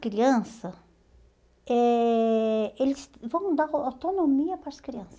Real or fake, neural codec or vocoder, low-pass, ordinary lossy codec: real; none; none; none